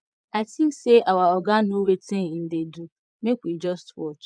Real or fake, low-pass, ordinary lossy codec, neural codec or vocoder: fake; 9.9 kHz; none; vocoder, 22.05 kHz, 80 mel bands, Vocos